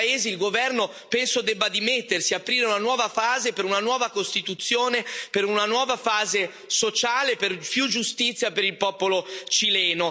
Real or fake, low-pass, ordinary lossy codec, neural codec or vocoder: real; none; none; none